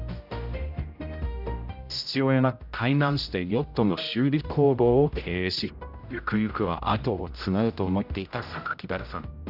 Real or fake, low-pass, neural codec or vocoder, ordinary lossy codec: fake; 5.4 kHz; codec, 16 kHz, 0.5 kbps, X-Codec, HuBERT features, trained on general audio; none